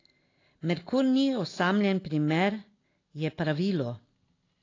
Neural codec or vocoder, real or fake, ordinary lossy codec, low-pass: none; real; AAC, 32 kbps; 7.2 kHz